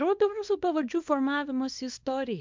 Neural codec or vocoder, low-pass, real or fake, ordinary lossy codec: codec, 24 kHz, 0.9 kbps, WavTokenizer, small release; 7.2 kHz; fake; MP3, 64 kbps